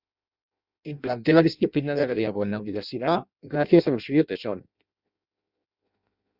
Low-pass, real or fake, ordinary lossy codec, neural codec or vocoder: 5.4 kHz; fake; Opus, 64 kbps; codec, 16 kHz in and 24 kHz out, 0.6 kbps, FireRedTTS-2 codec